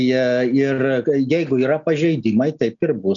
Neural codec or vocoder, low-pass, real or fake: none; 7.2 kHz; real